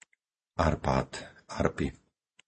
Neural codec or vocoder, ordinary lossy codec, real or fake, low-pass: none; MP3, 32 kbps; real; 10.8 kHz